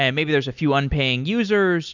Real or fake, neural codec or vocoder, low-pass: real; none; 7.2 kHz